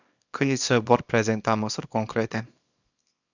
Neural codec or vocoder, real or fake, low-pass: codec, 24 kHz, 0.9 kbps, WavTokenizer, small release; fake; 7.2 kHz